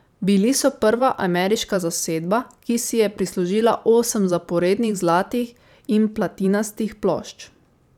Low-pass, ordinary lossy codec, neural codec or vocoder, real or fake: 19.8 kHz; none; vocoder, 44.1 kHz, 128 mel bands every 512 samples, BigVGAN v2; fake